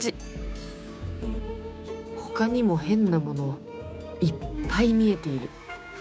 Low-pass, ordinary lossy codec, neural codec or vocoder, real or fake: none; none; codec, 16 kHz, 6 kbps, DAC; fake